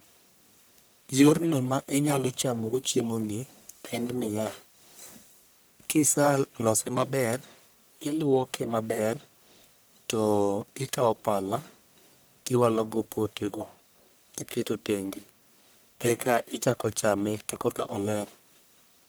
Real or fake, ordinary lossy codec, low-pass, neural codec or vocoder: fake; none; none; codec, 44.1 kHz, 1.7 kbps, Pupu-Codec